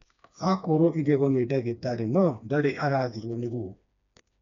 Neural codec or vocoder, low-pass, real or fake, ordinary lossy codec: codec, 16 kHz, 2 kbps, FreqCodec, smaller model; 7.2 kHz; fake; none